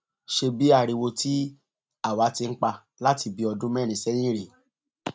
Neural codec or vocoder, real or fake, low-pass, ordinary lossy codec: none; real; none; none